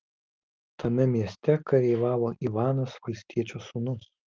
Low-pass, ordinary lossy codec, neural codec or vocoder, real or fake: 7.2 kHz; Opus, 32 kbps; none; real